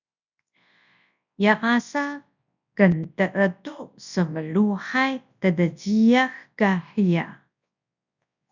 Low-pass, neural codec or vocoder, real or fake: 7.2 kHz; codec, 24 kHz, 0.9 kbps, WavTokenizer, large speech release; fake